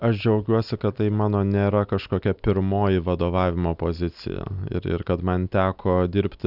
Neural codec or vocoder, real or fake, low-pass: none; real; 5.4 kHz